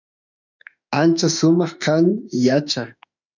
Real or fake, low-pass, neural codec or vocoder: fake; 7.2 kHz; autoencoder, 48 kHz, 32 numbers a frame, DAC-VAE, trained on Japanese speech